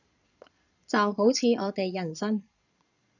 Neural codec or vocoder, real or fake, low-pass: vocoder, 22.05 kHz, 80 mel bands, Vocos; fake; 7.2 kHz